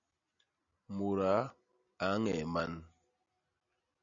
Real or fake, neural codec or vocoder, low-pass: real; none; 7.2 kHz